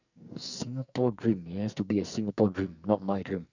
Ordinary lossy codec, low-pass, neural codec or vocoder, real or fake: none; 7.2 kHz; codec, 44.1 kHz, 2.6 kbps, SNAC; fake